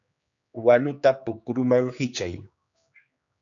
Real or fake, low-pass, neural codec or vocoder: fake; 7.2 kHz; codec, 16 kHz, 2 kbps, X-Codec, HuBERT features, trained on general audio